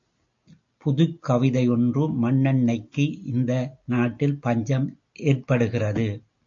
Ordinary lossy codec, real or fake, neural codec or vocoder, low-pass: AAC, 64 kbps; real; none; 7.2 kHz